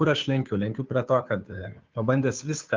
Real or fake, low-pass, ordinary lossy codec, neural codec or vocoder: fake; 7.2 kHz; Opus, 24 kbps; codec, 16 kHz, 2 kbps, FunCodec, trained on Chinese and English, 25 frames a second